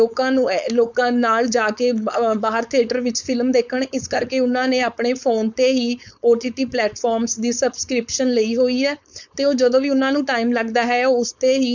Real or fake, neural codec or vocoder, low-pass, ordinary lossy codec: fake; codec, 16 kHz, 4.8 kbps, FACodec; 7.2 kHz; none